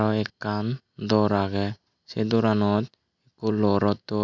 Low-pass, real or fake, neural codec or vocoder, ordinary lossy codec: 7.2 kHz; real; none; none